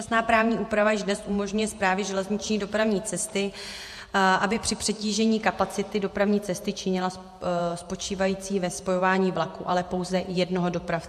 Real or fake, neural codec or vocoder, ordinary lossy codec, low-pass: fake; vocoder, 48 kHz, 128 mel bands, Vocos; MP3, 64 kbps; 14.4 kHz